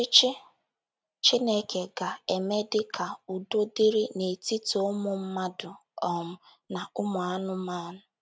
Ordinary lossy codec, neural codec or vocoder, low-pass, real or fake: none; none; none; real